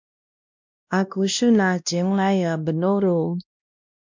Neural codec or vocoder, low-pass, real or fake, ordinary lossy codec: codec, 16 kHz, 1 kbps, X-Codec, WavLM features, trained on Multilingual LibriSpeech; 7.2 kHz; fake; MP3, 64 kbps